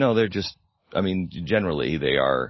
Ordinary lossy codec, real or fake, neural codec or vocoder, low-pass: MP3, 24 kbps; real; none; 7.2 kHz